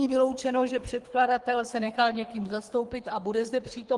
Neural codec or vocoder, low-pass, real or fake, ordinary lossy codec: codec, 24 kHz, 3 kbps, HILCodec; 10.8 kHz; fake; Opus, 24 kbps